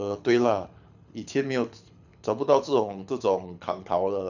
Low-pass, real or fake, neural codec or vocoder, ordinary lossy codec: 7.2 kHz; fake; codec, 24 kHz, 6 kbps, HILCodec; none